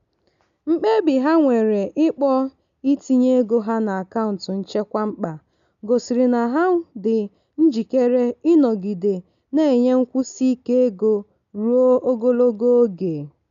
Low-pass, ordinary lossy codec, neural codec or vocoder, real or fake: 7.2 kHz; none; none; real